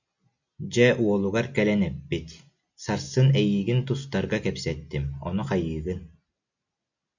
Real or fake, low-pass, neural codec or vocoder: real; 7.2 kHz; none